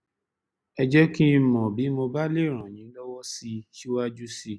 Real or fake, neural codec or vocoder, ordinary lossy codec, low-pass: real; none; none; none